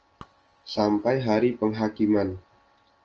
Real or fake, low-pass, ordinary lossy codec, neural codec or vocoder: real; 7.2 kHz; Opus, 24 kbps; none